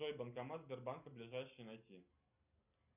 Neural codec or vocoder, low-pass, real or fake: none; 3.6 kHz; real